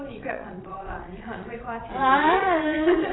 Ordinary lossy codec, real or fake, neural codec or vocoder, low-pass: AAC, 16 kbps; fake; vocoder, 22.05 kHz, 80 mel bands, Vocos; 7.2 kHz